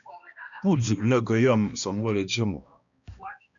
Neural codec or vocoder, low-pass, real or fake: codec, 16 kHz, 1 kbps, X-Codec, HuBERT features, trained on balanced general audio; 7.2 kHz; fake